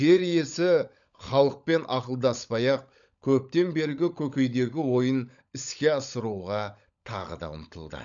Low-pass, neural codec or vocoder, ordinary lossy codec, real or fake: 7.2 kHz; codec, 16 kHz, 16 kbps, FunCodec, trained on LibriTTS, 50 frames a second; none; fake